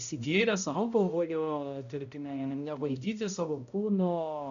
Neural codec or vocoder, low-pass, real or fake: codec, 16 kHz, 0.5 kbps, X-Codec, HuBERT features, trained on balanced general audio; 7.2 kHz; fake